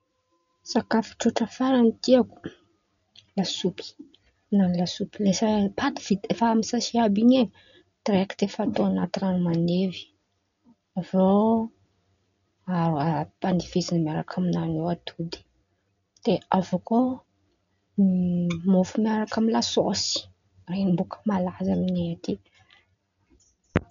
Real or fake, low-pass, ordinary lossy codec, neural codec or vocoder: real; 7.2 kHz; none; none